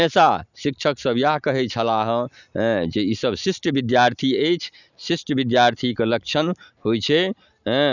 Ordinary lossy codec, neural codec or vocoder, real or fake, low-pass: none; none; real; 7.2 kHz